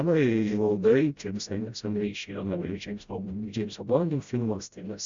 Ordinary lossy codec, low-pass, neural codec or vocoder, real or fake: Opus, 64 kbps; 7.2 kHz; codec, 16 kHz, 0.5 kbps, FreqCodec, smaller model; fake